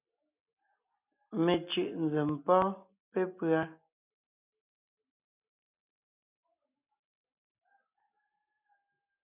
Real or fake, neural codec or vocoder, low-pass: real; none; 3.6 kHz